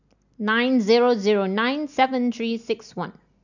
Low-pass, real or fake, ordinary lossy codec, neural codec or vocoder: 7.2 kHz; real; none; none